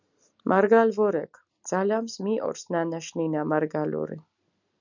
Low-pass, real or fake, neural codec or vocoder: 7.2 kHz; real; none